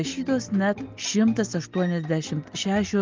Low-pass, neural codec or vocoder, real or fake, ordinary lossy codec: 7.2 kHz; none; real; Opus, 24 kbps